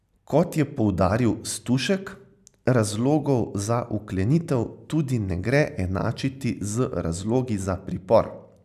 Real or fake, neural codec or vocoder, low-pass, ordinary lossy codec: real; none; 14.4 kHz; none